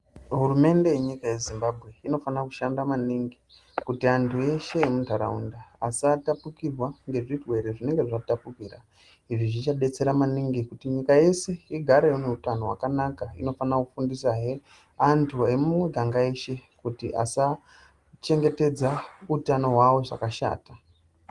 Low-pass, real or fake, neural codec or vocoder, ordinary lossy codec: 10.8 kHz; real; none; Opus, 24 kbps